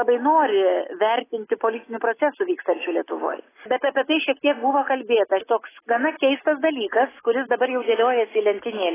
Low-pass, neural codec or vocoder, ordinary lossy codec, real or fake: 3.6 kHz; none; AAC, 16 kbps; real